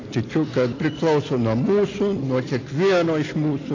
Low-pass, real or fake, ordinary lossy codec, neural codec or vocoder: 7.2 kHz; real; AAC, 32 kbps; none